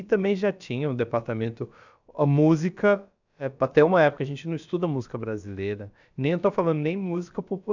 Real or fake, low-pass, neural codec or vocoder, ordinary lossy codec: fake; 7.2 kHz; codec, 16 kHz, about 1 kbps, DyCAST, with the encoder's durations; none